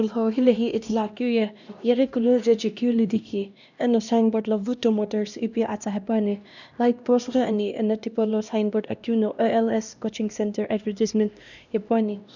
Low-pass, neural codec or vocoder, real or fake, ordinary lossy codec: 7.2 kHz; codec, 16 kHz, 1 kbps, X-Codec, HuBERT features, trained on LibriSpeech; fake; none